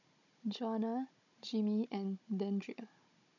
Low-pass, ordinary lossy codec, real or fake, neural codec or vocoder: 7.2 kHz; none; fake; codec, 16 kHz, 16 kbps, FunCodec, trained on Chinese and English, 50 frames a second